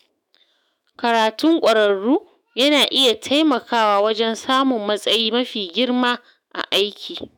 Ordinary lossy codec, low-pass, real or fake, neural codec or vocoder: none; none; fake; autoencoder, 48 kHz, 128 numbers a frame, DAC-VAE, trained on Japanese speech